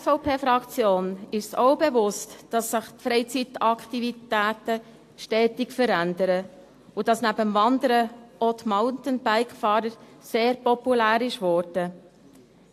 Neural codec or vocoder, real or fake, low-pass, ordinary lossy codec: none; real; 14.4 kHz; AAC, 64 kbps